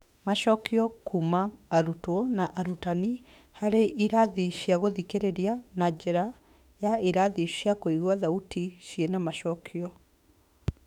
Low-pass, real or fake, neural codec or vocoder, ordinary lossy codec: 19.8 kHz; fake; autoencoder, 48 kHz, 32 numbers a frame, DAC-VAE, trained on Japanese speech; none